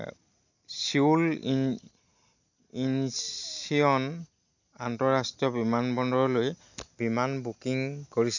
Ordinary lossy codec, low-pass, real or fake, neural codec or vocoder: none; 7.2 kHz; real; none